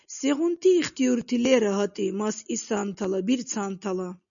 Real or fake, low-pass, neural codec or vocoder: real; 7.2 kHz; none